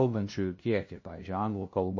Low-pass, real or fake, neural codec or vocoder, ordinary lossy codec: 7.2 kHz; fake; codec, 16 kHz, 0.5 kbps, FunCodec, trained on LibriTTS, 25 frames a second; MP3, 32 kbps